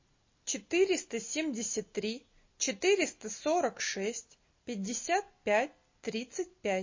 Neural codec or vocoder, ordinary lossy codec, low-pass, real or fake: none; MP3, 32 kbps; 7.2 kHz; real